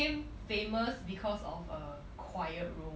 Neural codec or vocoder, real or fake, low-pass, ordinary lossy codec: none; real; none; none